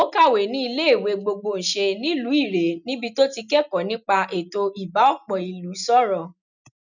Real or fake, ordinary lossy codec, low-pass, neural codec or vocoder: real; none; 7.2 kHz; none